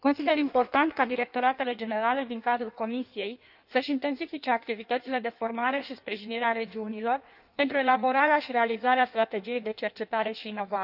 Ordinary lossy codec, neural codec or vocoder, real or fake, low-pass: none; codec, 16 kHz in and 24 kHz out, 1.1 kbps, FireRedTTS-2 codec; fake; 5.4 kHz